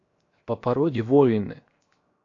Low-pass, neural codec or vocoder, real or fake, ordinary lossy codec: 7.2 kHz; codec, 16 kHz, 0.7 kbps, FocalCodec; fake; AAC, 48 kbps